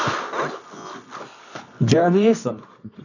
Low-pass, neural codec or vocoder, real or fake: 7.2 kHz; codec, 24 kHz, 0.9 kbps, WavTokenizer, medium music audio release; fake